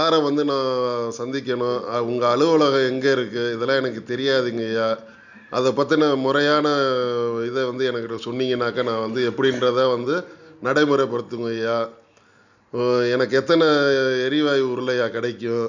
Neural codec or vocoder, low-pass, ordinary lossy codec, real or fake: none; 7.2 kHz; none; real